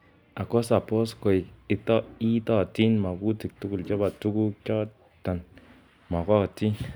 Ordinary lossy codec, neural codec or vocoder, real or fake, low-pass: none; none; real; none